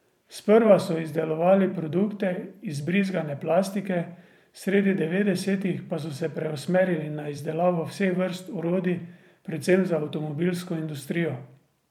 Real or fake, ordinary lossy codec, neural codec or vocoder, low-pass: fake; MP3, 96 kbps; vocoder, 44.1 kHz, 128 mel bands every 512 samples, BigVGAN v2; 19.8 kHz